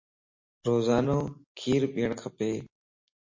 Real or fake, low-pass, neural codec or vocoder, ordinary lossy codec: real; 7.2 kHz; none; MP3, 32 kbps